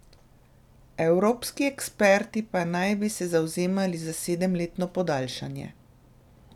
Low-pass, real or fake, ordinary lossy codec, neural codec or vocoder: 19.8 kHz; real; none; none